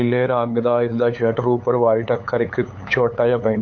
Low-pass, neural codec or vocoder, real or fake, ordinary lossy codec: 7.2 kHz; codec, 16 kHz, 8 kbps, FunCodec, trained on LibriTTS, 25 frames a second; fake; AAC, 48 kbps